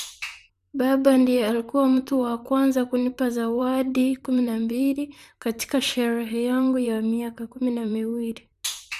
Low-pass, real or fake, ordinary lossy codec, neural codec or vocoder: 14.4 kHz; fake; none; vocoder, 44.1 kHz, 128 mel bands, Pupu-Vocoder